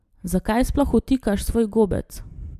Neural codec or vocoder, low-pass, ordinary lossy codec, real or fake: none; 14.4 kHz; MP3, 96 kbps; real